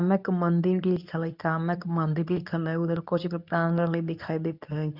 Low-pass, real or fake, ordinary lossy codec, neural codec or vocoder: 5.4 kHz; fake; none; codec, 24 kHz, 0.9 kbps, WavTokenizer, medium speech release version 2